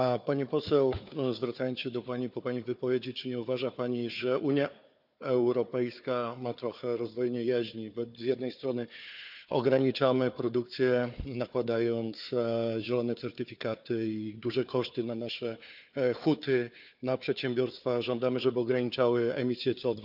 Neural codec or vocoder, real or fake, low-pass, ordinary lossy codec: codec, 16 kHz, 4 kbps, FunCodec, trained on Chinese and English, 50 frames a second; fake; 5.4 kHz; none